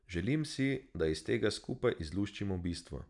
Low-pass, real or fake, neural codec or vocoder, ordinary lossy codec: 10.8 kHz; real; none; none